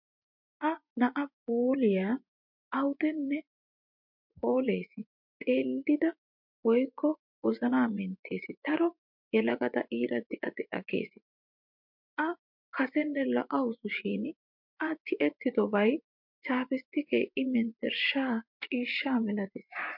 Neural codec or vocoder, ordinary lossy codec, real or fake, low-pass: vocoder, 24 kHz, 100 mel bands, Vocos; MP3, 48 kbps; fake; 5.4 kHz